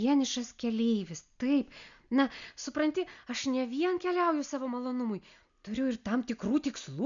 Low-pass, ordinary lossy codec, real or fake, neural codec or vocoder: 7.2 kHz; MP3, 96 kbps; real; none